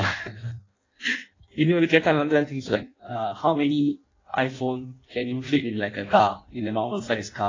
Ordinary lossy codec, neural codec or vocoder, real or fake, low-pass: AAC, 32 kbps; codec, 16 kHz in and 24 kHz out, 0.6 kbps, FireRedTTS-2 codec; fake; 7.2 kHz